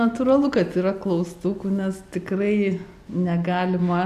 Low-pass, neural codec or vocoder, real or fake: 14.4 kHz; none; real